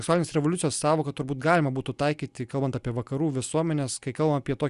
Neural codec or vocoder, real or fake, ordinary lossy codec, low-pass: none; real; Opus, 64 kbps; 10.8 kHz